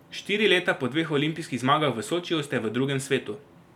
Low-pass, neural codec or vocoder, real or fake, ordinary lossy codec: 19.8 kHz; none; real; none